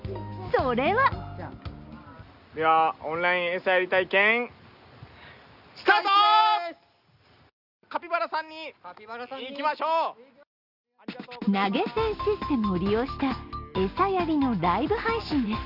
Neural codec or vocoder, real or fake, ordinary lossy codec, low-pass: none; real; Opus, 64 kbps; 5.4 kHz